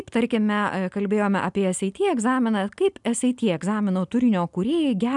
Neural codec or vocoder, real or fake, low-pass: none; real; 10.8 kHz